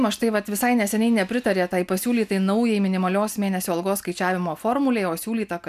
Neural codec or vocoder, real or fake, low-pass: none; real; 14.4 kHz